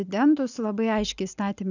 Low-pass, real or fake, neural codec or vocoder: 7.2 kHz; real; none